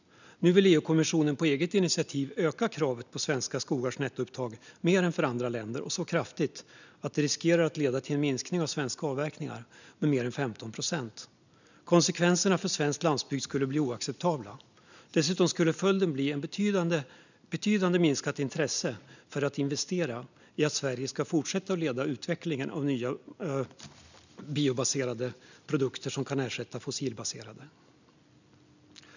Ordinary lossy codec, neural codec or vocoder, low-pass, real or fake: none; none; 7.2 kHz; real